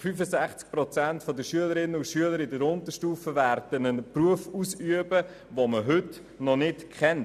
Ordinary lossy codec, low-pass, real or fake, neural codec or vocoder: none; 14.4 kHz; real; none